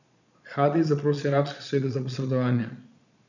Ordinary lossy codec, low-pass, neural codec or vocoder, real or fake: none; 7.2 kHz; vocoder, 22.05 kHz, 80 mel bands, Vocos; fake